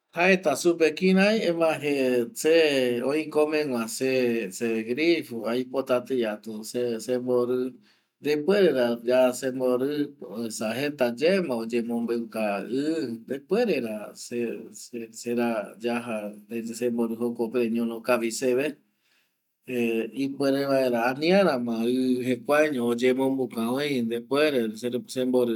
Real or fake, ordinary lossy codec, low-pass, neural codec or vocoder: real; none; 19.8 kHz; none